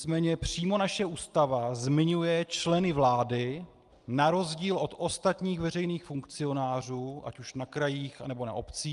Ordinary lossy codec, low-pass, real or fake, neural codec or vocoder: Opus, 32 kbps; 10.8 kHz; real; none